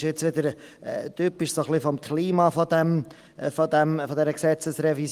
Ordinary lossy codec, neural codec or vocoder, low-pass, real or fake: Opus, 24 kbps; none; 14.4 kHz; real